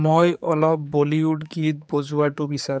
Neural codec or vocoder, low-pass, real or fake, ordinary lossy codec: codec, 16 kHz, 4 kbps, X-Codec, HuBERT features, trained on general audio; none; fake; none